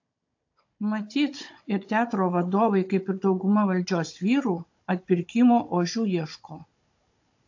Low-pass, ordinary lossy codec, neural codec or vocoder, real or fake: 7.2 kHz; AAC, 48 kbps; codec, 16 kHz, 16 kbps, FunCodec, trained on Chinese and English, 50 frames a second; fake